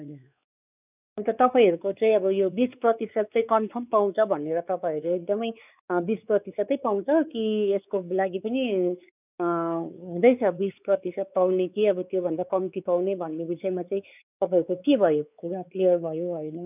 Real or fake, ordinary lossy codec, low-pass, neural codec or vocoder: fake; none; 3.6 kHz; codec, 16 kHz, 4 kbps, X-Codec, WavLM features, trained on Multilingual LibriSpeech